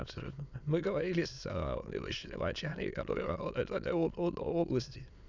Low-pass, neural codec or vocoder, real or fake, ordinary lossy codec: 7.2 kHz; autoencoder, 22.05 kHz, a latent of 192 numbers a frame, VITS, trained on many speakers; fake; none